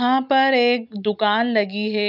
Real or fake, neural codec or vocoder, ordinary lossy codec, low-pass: real; none; none; 5.4 kHz